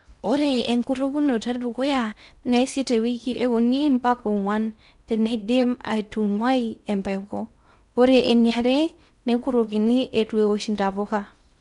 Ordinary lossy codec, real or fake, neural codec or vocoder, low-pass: MP3, 96 kbps; fake; codec, 16 kHz in and 24 kHz out, 0.6 kbps, FocalCodec, streaming, 4096 codes; 10.8 kHz